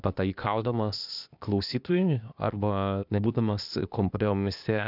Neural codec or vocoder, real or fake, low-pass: codec, 16 kHz, 0.8 kbps, ZipCodec; fake; 5.4 kHz